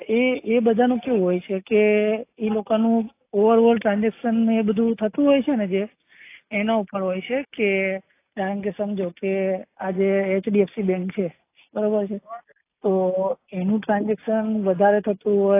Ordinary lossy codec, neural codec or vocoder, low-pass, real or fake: AAC, 24 kbps; none; 3.6 kHz; real